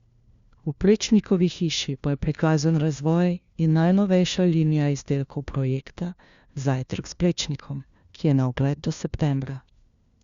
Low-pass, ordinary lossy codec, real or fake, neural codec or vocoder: 7.2 kHz; Opus, 64 kbps; fake; codec, 16 kHz, 1 kbps, FunCodec, trained on LibriTTS, 50 frames a second